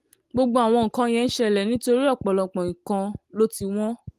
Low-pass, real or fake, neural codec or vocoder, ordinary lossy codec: 14.4 kHz; real; none; Opus, 24 kbps